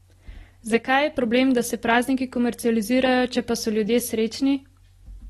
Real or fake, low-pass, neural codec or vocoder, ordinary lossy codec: real; 19.8 kHz; none; AAC, 32 kbps